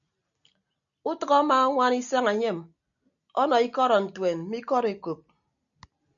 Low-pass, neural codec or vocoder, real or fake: 7.2 kHz; none; real